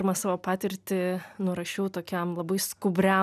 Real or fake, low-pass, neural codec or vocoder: real; 14.4 kHz; none